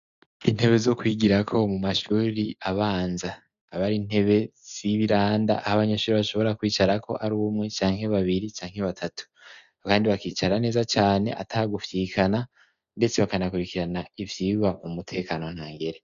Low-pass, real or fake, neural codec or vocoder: 7.2 kHz; real; none